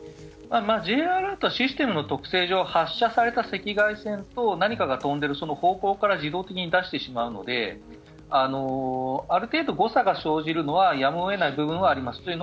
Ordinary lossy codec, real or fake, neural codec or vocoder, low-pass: none; real; none; none